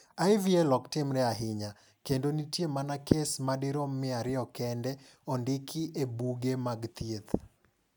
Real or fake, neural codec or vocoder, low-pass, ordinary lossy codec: real; none; none; none